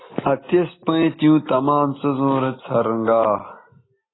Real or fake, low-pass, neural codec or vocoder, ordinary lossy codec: real; 7.2 kHz; none; AAC, 16 kbps